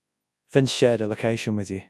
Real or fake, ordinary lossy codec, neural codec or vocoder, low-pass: fake; none; codec, 24 kHz, 0.9 kbps, WavTokenizer, large speech release; none